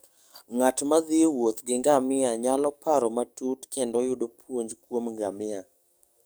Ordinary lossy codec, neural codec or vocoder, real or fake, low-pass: none; codec, 44.1 kHz, 7.8 kbps, DAC; fake; none